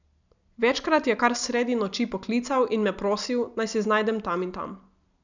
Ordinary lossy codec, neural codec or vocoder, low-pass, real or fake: none; none; 7.2 kHz; real